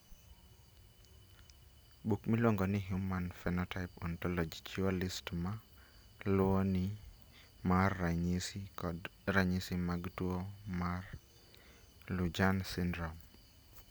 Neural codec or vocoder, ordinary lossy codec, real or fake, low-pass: none; none; real; none